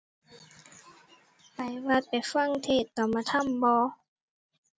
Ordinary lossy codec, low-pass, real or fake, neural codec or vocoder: none; none; real; none